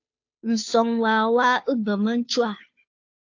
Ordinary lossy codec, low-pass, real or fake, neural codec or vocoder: AAC, 48 kbps; 7.2 kHz; fake; codec, 16 kHz, 2 kbps, FunCodec, trained on Chinese and English, 25 frames a second